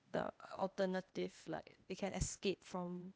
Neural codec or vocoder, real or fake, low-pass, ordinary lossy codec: codec, 16 kHz, 0.8 kbps, ZipCodec; fake; none; none